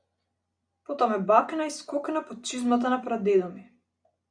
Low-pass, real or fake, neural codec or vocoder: 9.9 kHz; real; none